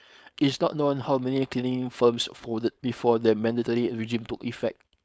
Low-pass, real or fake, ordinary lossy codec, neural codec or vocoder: none; fake; none; codec, 16 kHz, 4.8 kbps, FACodec